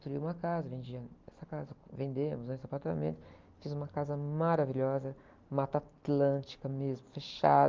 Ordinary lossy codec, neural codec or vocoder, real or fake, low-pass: Opus, 24 kbps; none; real; 7.2 kHz